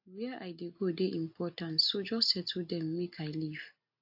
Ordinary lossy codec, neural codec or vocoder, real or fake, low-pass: AAC, 48 kbps; none; real; 5.4 kHz